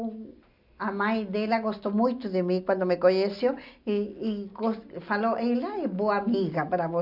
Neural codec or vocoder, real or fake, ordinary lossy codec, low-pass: none; real; none; 5.4 kHz